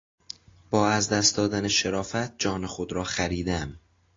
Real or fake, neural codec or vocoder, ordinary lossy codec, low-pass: real; none; AAC, 32 kbps; 7.2 kHz